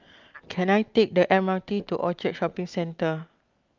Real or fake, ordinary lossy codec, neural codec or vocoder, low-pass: real; Opus, 24 kbps; none; 7.2 kHz